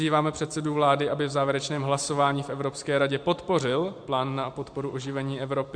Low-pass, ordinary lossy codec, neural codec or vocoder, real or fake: 9.9 kHz; MP3, 64 kbps; vocoder, 44.1 kHz, 128 mel bands every 512 samples, BigVGAN v2; fake